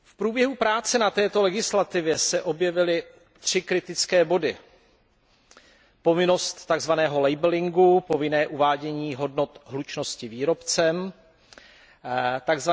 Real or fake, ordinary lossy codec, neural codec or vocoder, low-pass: real; none; none; none